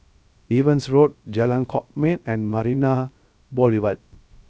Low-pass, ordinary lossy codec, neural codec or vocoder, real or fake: none; none; codec, 16 kHz, 0.3 kbps, FocalCodec; fake